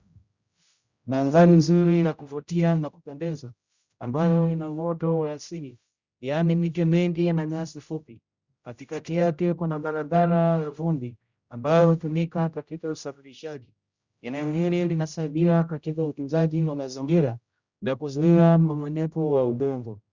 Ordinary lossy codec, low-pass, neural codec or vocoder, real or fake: Opus, 64 kbps; 7.2 kHz; codec, 16 kHz, 0.5 kbps, X-Codec, HuBERT features, trained on general audio; fake